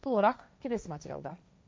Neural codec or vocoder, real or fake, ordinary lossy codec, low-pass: codec, 16 kHz, 1.1 kbps, Voila-Tokenizer; fake; none; none